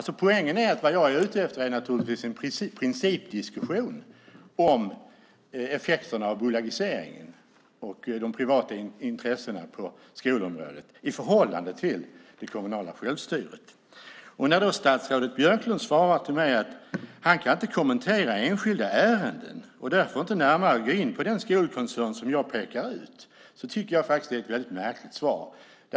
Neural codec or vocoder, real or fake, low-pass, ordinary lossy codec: none; real; none; none